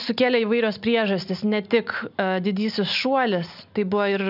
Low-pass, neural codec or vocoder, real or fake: 5.4 kHz; none; real